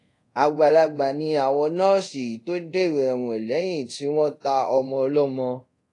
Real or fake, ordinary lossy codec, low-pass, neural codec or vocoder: fake; AAC, 48 kbps; 10.8 kHz; codec, 24 kHz, 0.5 kbps, DualCodec